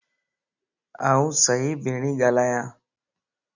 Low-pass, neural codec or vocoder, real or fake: 7.2 kHz; none; real